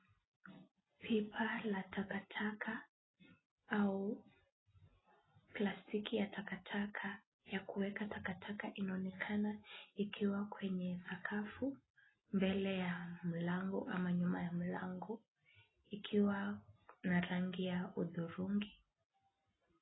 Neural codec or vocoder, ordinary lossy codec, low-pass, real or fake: none; AAC, 16 kbps; 7.2 kHz; real